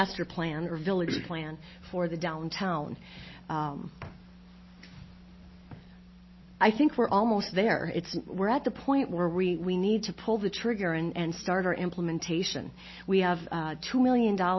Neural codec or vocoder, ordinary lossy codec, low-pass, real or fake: none; MP3, 24 kbps; 7.2 kHz; real